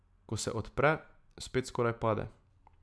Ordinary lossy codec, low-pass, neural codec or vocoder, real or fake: none; none; none; real